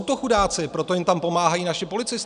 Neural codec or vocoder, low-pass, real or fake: none; 9.9 kHz; real